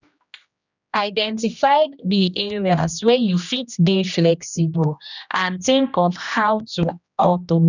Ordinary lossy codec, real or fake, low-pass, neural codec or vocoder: none; fake; 7.2 kHz; codec, 16 kHz, 1 kbps, X-Codec, HuBERT features, trained on general audio